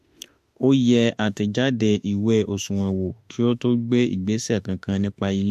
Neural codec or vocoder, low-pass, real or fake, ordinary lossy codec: autoencoder, 48 kHz, 32 numbers a frame, DAC-VAE, trained on Japanese speech; 14.4 kHz; fake; MP3, 64 kbps